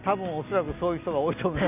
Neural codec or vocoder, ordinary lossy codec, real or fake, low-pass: none; none; real; 3.6 kHz